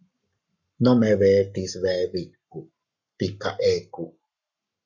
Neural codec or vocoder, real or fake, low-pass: codec, 44.1 kHz, 7.8 kbps, Pupu-Codec; fake; 7.2 kHz